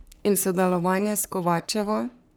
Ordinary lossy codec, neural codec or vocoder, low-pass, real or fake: none; codec, 44.1 kHz, 3.4 kbps, Pupu-Codec; none; fake